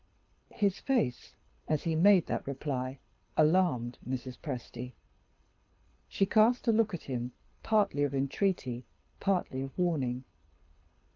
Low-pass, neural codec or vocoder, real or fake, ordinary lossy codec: 7.2 kHz; codec, 24 kHz, 6 kbps, HILCodec; fake; Opus, 24 kbps